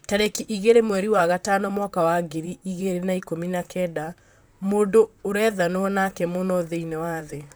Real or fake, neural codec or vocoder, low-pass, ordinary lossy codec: fake; vocoder, 44.1 kHz, 128 mel bands, Pupu-Vocoder; none; none